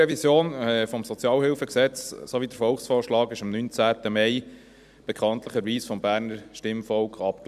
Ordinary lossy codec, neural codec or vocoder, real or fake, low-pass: none; vocoder, 44.1 kHz, 128 mel bands every 256 samples, BigVGAN v2; fake; 14.4 kHz